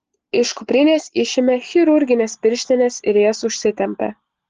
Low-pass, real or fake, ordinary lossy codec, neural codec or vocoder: 7.2 kHz; real; Opus, 16 kbps; none